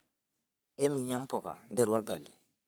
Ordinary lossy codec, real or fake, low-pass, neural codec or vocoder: none; fake; none; codec, 44.1 kHz, 3.4 kbps, Pupu-Codec